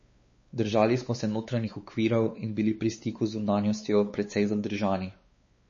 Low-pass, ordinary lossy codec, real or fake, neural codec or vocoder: 7.2 kHz; MP3, 32 kbps; fake; codec, 16 kHz, 4 kbps, X-Codec, WavLM features, trained on Multilingual LibriSpeech